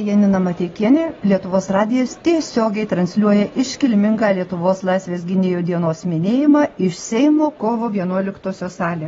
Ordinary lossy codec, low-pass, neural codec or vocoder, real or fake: AAC, 24 kbps; 7.2 kHz; none; real